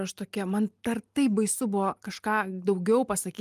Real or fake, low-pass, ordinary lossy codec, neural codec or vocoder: real; 14.4 kHz; Opus, 32 kbps; none